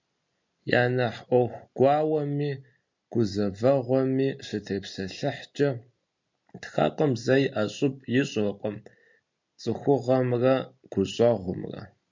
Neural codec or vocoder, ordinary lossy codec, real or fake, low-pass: none; MP3, 64 kbps; real; 7.2 kHz